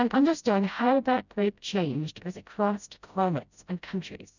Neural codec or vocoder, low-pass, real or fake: codec, 16 kHz, 0.5 kbps, FreqCodec, smaller model; 7.2 kHz; fake